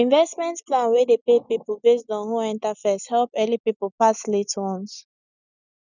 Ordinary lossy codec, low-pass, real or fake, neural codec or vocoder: none; 7.2 kHz; real; none